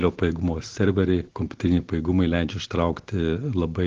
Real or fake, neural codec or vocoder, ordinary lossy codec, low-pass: real; none; Opus, 16 kbps; 7.2 kHz